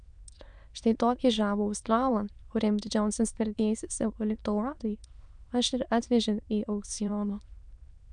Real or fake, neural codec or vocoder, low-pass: fake; autoencoder, 22.05 kHz, a latent of 192 numbers a frame, VITS, trained on many speakers; 9.9 kHz